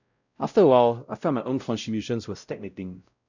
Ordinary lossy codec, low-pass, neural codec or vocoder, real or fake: none; 7.2 kHz; codec, 16 kHz, 0.5 kbps, X-Codec, WavLM features, trained on Multilingual LibriSpeech; fake